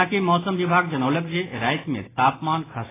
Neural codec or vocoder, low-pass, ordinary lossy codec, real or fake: none; 3.6 kHz; AAC, 16 kbps; real